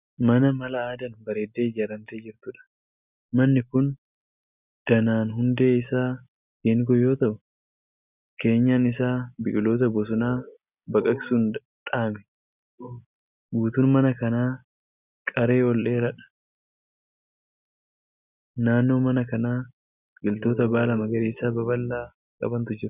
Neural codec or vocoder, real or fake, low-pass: none; real; 3.6 kHz